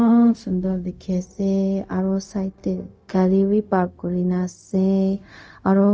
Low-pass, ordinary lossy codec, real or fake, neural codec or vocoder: none; none; fake; codec, 16 kHz, 0.4 kbps, LongCat-Audio-Codec